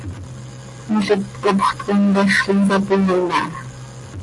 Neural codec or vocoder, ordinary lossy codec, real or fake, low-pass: none; AAC, 48 kbps; real; 10.8 kHz